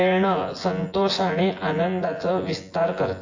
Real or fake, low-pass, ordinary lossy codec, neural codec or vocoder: fake; 7.2 kHz; AAC, 48 kbps; vocoder, 24 kHz, 100 mel bands, Vocos